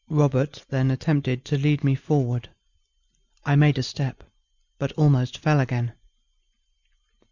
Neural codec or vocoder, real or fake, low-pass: none; real; 7.2 kHz